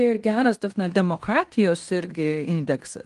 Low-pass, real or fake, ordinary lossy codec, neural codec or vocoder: 10.8 kHz; fake; Opus, 24 kbps; codec, 16 kHz in and 24 kHz out, 0.9 kbps, LongCat-Audio-Codec, fine tuned four codebook decoder